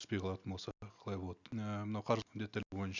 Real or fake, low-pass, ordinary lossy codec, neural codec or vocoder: real; 7.2 kHz; none; none